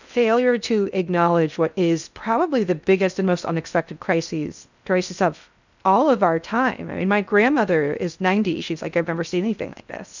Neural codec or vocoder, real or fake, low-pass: codec, 16 kHz in and 24 kHz out, 0.6 kbps, FocalCodec, streaming, 2048 codes; fake; 7.2 kHz